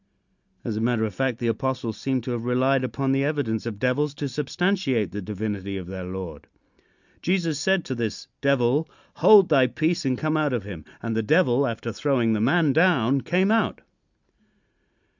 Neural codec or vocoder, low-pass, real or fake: none; 7.2 kHz; real